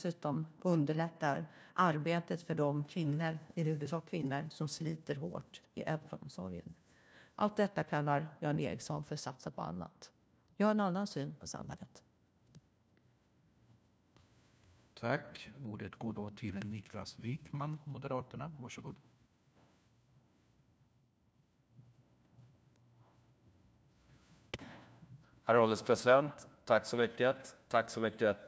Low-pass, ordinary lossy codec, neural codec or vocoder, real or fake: none; none; codec, 16 kHz, 1 kbps, FunCodec, trained on LibriTTS, 50 frames a second; fake